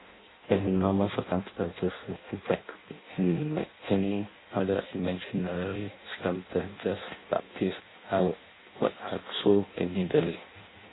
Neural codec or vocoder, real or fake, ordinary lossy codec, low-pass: codec, 16 kHz in and 24 kHz out, 0.6 kbps, FireRedTTS-2 codec; fake; AAC, 16 kbps; 7.2 kHz